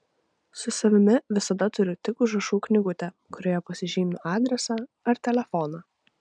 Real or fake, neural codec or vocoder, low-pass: real; none; 9.9 kHz